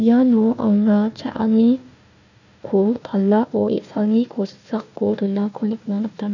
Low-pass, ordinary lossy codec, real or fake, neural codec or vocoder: 7.2 kHz; none; fake; codec, 16 kHz, 1 kbps, FunCodec, trained on Chinese and English, 50 frames a second